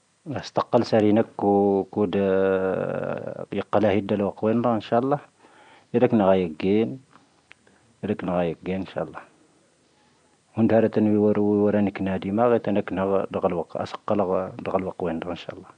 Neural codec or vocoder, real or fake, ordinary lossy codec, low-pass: none; real; none; 9.9 kHz